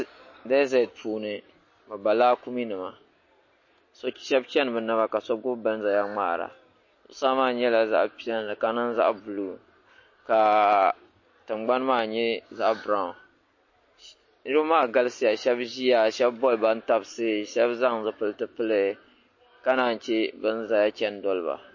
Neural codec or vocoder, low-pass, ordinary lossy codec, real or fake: none; 7.2 kHz; MP3, 32 kbps; real